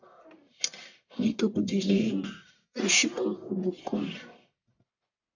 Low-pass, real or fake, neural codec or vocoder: 7.2 kHz; fake; codec, 44.1 kHz, 1.7 kbps, Pupu-Codec